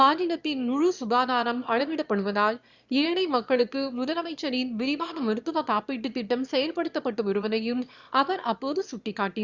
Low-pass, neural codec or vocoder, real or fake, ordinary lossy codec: 7.2 kHz; autoencoder, 22.05 kHz, a latent of 192 numbers a frame, VITS, trained on one speaker; fake; Opus, 64 kbps